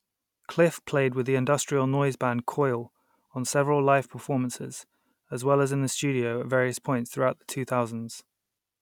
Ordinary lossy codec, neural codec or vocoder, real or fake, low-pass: none; none; real; 19.8 kHz